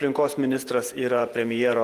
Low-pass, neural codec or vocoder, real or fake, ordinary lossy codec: 14.4 kHz; vocoder, 48 kHz, 128 mel bands, Vocos; fake; Opus, 24 kbps